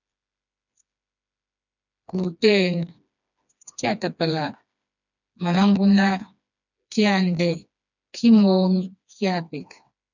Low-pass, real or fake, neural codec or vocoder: 7.2 kHz; fake; codec, 16 kHz, 2 kbps, FreqCodec, smaller model